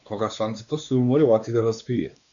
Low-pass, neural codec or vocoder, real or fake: 7.2 kHz; codec, 16 kHz, 2 kbps, X-Codec, WavLM features, trained on Multilingual LibriSpeech; fake